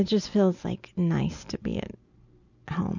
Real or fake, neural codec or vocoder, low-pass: real; none; 7.2 kHz